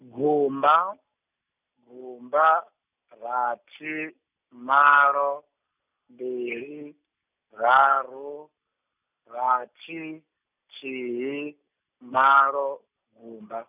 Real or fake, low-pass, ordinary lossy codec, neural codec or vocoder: real; 3.6 kHz; none; none